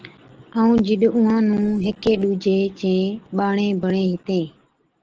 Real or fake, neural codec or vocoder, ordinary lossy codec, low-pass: real; none; Opus, 16 kbps; 7.2 kHz